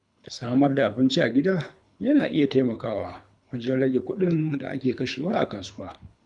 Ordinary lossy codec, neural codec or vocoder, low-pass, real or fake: none; codec, 24 kHz, 3 kbps, HILCodec; 10.8 kHz; fake